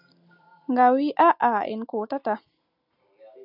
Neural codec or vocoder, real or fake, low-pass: none; real; 5.4 kHz